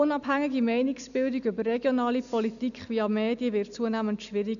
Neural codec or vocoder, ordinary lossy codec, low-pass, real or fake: none; none; 7.2 kHz; real